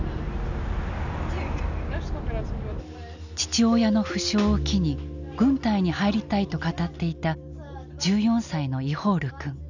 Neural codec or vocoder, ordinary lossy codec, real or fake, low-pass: none; none; real; 7.2 kHz